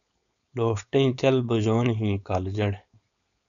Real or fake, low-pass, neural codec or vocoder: fake; 7.2 kHz; codec, 16 kHz, 4.8 kbps, FACodec